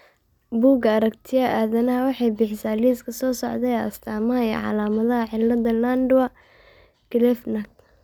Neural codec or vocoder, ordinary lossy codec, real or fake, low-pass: none; none; real; 19.8 kHz